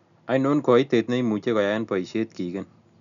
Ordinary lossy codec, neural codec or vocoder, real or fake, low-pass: none; none; real; 7.2 kHz